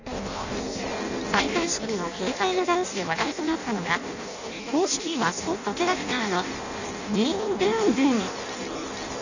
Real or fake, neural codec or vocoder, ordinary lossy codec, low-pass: fake; codec, 16 kHz in and 24 kHz out, 0.6 kbps, FireRedTTS-2 codec; none; 7.2 kHz